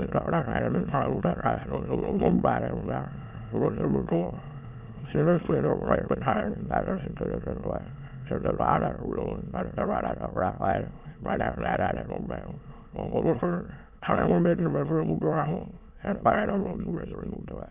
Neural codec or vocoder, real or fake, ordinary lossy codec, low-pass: autoencoder, 22.05 kHz, a latent of 192 numbers a frame, VITS, trained on many speakers; fake; none; 3.6 kHz